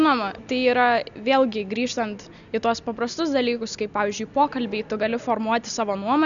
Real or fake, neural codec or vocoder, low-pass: real; none; 7.2 kHz